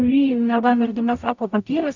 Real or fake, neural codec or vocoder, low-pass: fake; codec, 44.1 kHz, 0.9 kbps, DAC; 7.2 kHz